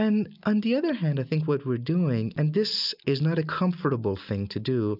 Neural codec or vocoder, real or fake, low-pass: none; real; 5.4 kHz